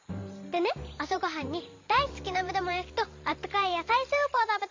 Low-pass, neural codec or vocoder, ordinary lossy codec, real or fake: 7.2 kHz; vocoder, 44.1 kHz, 128 mel bands every 512 samples, BigVGAN v2; MP3, 48 kbps; fake